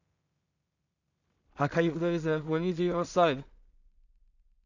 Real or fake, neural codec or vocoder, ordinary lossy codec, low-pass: fake; codec, 16 kHz in and 24 kHz out, 0.4 kbps, LongCat-Audio-Codec, two codebook decoder; none; 7.2 kHz